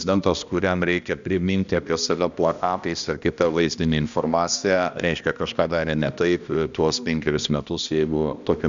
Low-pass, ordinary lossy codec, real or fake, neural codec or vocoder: 7.2 kHz; Opus, 64 kbps; fake; codec, 16 kHz, 1 kbps, X-Codec, HuBERT features, trained on balanced general audio